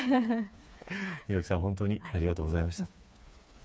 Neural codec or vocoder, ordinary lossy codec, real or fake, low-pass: codec, 16 kHz, 4 kbps, FreqCodec, smaller model; none; fake; none